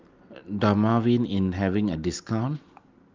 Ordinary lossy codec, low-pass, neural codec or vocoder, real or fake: Opus, 32 kbps; 7.2 kHz; none; real